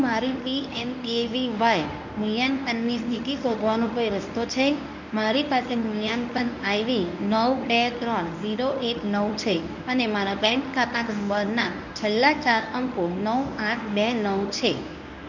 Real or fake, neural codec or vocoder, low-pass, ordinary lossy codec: fake; codec, 24 kHz, 0.9 kbps, WavTokenizer, medium speech release version 1; 7.2 kHz; none